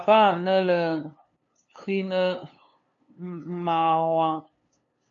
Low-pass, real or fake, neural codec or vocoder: 7.2 kHz; fake; codec, 16 kHz, 2 kbps, FunCodec, trained on LibriTTS, 25 frames a second